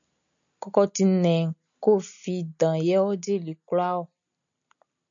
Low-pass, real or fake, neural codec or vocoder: 7.2 kHz; real; none